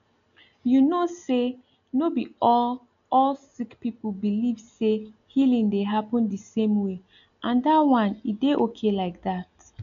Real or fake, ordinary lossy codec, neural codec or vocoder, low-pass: real; none; none; 7.2 kHz